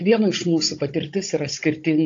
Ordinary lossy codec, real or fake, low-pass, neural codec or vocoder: AAC, 48 kbps; fake; 7.2 kHz; codec, 16 kHz, 16 kbps, FunCodec, trained on Chinese and English, 50 frames a second